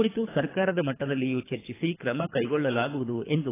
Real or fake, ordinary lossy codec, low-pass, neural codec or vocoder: fake; AAC, 16 kbps; 3.6 kHz; codec, 24 kHz, 3 kbps, HILCodec